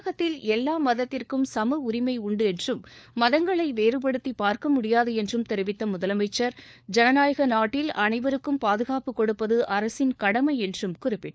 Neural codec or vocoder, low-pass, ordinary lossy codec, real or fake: codec, 16 kHz, 4 kbps, FunCodec, trained on LibriTTS, 50 frames a second; none; none; fake